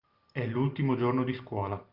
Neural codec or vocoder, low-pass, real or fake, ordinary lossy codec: none; 5.4 kHz; real; Opus, 24 kbps